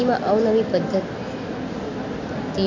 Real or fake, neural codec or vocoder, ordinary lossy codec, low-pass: real; none; AAC, 48 kbps; 7.2 kHz